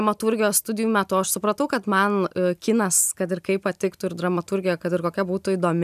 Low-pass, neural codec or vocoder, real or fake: 14.4 kHz; none; real